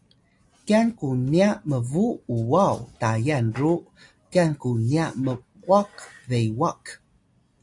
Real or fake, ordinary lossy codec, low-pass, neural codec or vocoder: real; AAC, 64 kbps; 10.8 kHz; none